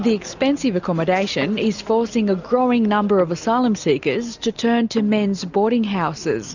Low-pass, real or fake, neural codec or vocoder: 7.2 kHz; real; none